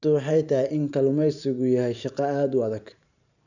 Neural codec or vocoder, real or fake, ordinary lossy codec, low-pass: vocoder, 44.1 kHz, 128 mel bands every 512 samples, BigVGAN v2; fake; none; 7.2 kHz